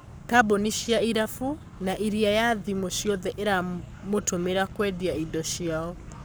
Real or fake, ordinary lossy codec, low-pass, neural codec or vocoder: fake; none; none; codec, 44.1 kHz, 7.8 kbps, Pupu-Codec